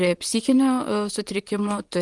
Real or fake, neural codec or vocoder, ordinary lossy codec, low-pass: fake; vocoder, 44.1 kHz, 128 mel bands, Pupu-Vocoder; Opus, 24 kbps; 10.8 kHz